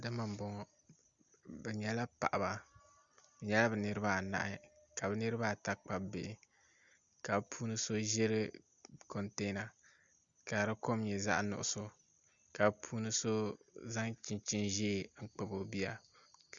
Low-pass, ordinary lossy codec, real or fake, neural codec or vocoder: 7.2 kHz; Opus, 64 kbps; real; none